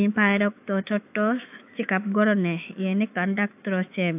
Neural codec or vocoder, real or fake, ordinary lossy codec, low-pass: autoencoder, 48 kHz, 128 numbers a frame, DAC-VAE, trained on Japanese speech; fake; AAC, 32 kbps; 3.6 kHz